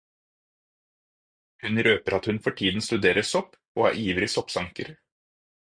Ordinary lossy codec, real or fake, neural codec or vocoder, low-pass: Opus, 64 kbps; real; none; 9.9 kHz